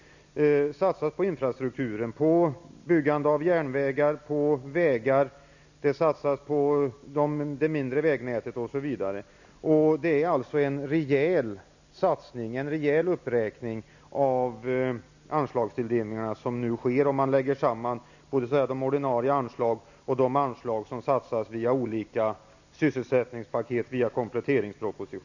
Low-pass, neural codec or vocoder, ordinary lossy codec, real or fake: 7.2 kHz; none; none; real